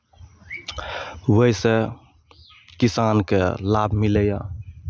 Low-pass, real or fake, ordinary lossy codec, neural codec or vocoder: none; real; none; none